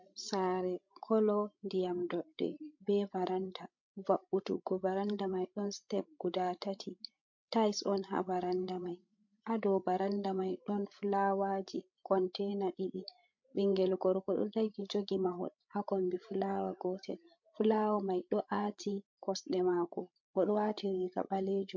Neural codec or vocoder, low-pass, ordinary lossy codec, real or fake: codec, 16 kHz, 16 kbps, FreqCodec, larger model; 7.2 kHz; MP3, 48 kbps; fake